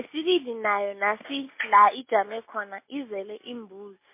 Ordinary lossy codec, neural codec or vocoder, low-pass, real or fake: MP3, 24 kbps; none; 3.6 kHz; real